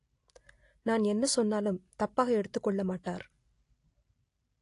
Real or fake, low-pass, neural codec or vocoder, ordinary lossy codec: real; 10.8 kHz; none; AAC, 48 kbps